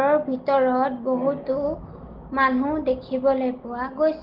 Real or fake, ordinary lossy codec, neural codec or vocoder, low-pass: real; Opus, 16 kbps; none; 5.4 kHz